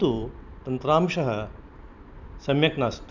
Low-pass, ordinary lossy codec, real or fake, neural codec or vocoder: 7.2 kHz; none; fake; vocoder, 44.1 kHz, 128 mel bands every 256 samples, BigVGAN v2